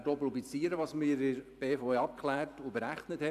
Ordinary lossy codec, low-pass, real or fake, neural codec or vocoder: none; 14.4 kHz; fake; vocoder, 44.1 kHz, 128 mel bands every 512 samples, BigVGAN v2